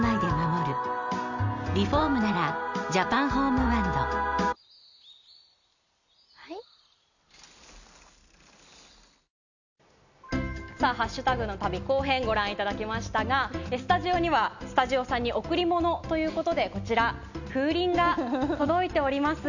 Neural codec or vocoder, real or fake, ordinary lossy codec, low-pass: none; real; none; 7.2 kHz